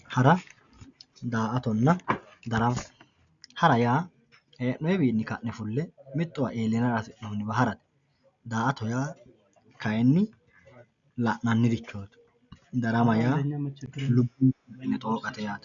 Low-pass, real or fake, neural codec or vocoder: 7.2 kHz; real; none